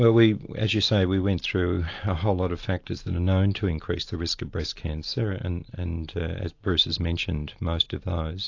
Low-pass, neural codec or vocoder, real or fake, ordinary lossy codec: 7.2 kHz; none; real; AAC, 48 kbps